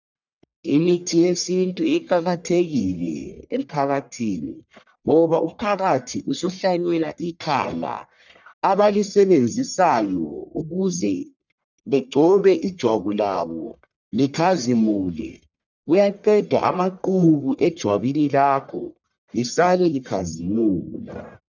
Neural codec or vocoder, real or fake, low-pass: codec, 44.1 kHz, 1.7 kbps, Pupu-Codec; fake; 7.2 kHz